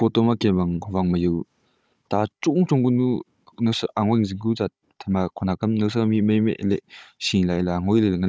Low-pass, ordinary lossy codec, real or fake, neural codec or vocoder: none; none; fake; codec, 16 kHz, 16 kbps, FunCodec, trained on Chinese and English, 50 frames a second